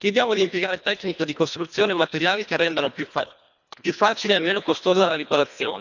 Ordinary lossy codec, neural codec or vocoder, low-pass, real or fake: none; codec, 24 kHz, 1.5 kbps, HILCodec; 7.2 kHz; fake